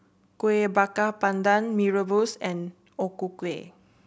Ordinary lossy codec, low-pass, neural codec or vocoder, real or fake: none; none; none; real